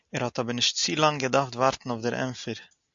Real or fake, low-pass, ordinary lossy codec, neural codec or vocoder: real; 7.2 kHz; AAC, 64 kbps; none